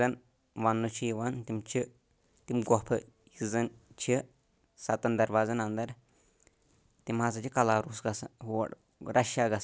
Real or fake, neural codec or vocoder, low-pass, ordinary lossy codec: real; none; none; none